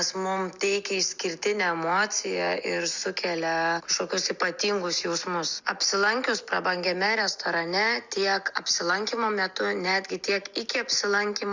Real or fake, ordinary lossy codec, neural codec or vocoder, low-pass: real; Opus, 64 kbps; none; 7.2 kHz